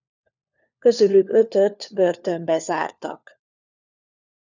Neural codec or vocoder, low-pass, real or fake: codec, 16 kHz, 4 kbps, FunCodec, trained on LibriTTS, 50 frames a second; 7.2 kHz; fake